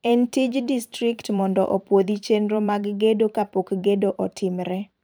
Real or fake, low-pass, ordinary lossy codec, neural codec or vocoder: fake; none; none; vocoder, 44.1 kHz, 128 mel bands every 512 samples, BigVGAN v2